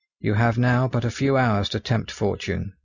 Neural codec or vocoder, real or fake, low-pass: none; real; 7.2 kHz